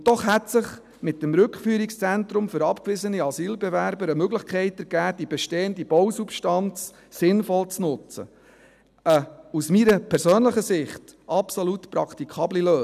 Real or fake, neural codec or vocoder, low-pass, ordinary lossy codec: real; none; 14.4 kHz; none